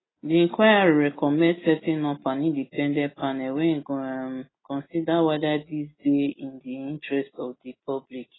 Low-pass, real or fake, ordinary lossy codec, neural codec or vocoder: 7.2 kHz; real; AAC, 16 kbps; none